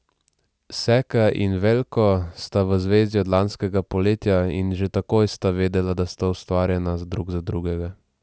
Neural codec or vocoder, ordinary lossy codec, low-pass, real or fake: none; none; none; real